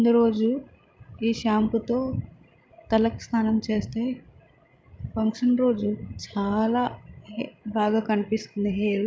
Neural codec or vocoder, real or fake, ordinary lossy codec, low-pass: codec, 16 kHz, 16 kbps, FreqCodec, larger model; fake; none; none